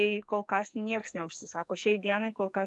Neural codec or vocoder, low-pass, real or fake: codec, 44.1 kHz, 2.6 kbps, SNAC; 10.8 kHz; fake